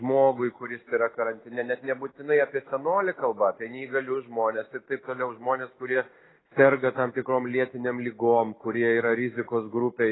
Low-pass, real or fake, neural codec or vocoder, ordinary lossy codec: 7.2 kHz; real; none; AAC, 16 kbps